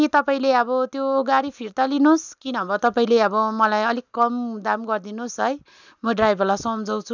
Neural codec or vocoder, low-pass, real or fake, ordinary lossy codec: none; 7.2 kHz; real; none